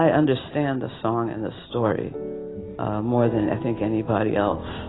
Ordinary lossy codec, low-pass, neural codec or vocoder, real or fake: AAC, 16 kbps; 7.2 kHz; none; real